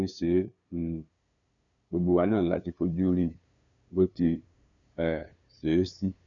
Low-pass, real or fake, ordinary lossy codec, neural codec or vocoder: 7.2 kHz; fake; none; codec, 16 kHz, 2 kbps, FunCodec, trained on LibriTTS, 25 frames a second